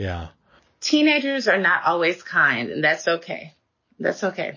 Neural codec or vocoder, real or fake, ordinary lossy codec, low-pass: none; real; MP3, 32 kbps; 7.2 kHz